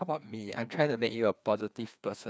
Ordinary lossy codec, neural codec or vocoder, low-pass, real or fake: none; codec, 16 kHz, 2 kbps, FreqCodec, larger model; none; fake